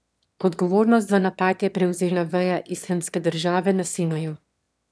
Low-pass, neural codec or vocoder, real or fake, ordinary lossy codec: none; autoencoder, 22.05 kHz, a latent of 192 numbers a frame, VITS, trained on one speaker; fake; none